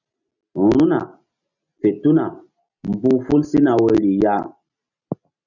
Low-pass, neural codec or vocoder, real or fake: 7.2 kHz; none; real